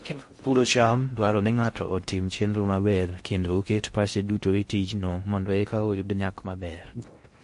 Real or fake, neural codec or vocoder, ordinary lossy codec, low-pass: fake; codec, 16 kHz in and 24 kHz out, 0.6 kbps, FocalCodec, streaming, 4096 codes; MP3, 48 kbps; 10.8 kHz